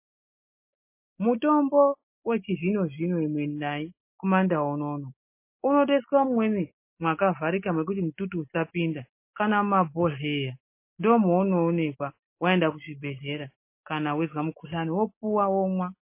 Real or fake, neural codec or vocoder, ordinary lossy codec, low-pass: real; none; MP3, 24 kbps; 3.6 kHz